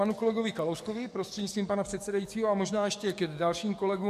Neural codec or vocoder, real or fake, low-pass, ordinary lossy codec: codec, 44.1 kHz, 7.8 kbps, DAC; fake; 14.4 kHz; MP3, 64 kbps